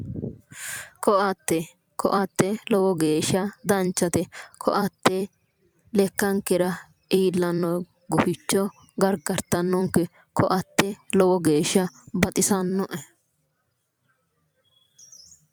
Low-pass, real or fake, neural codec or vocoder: 19.8 kHz; fake; vocoder, 44.1 kHz, 128 mel bands every 256 samples, BigVGAN v2